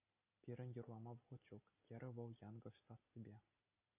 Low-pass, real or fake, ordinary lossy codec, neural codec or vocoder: 3.6 kHz; real; AAC, 32 kbps; none